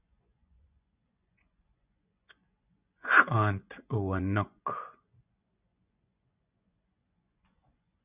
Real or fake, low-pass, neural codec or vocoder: fake; 3.6 kHz; vocoder, 24 kHz, 100 mel bands, Vocos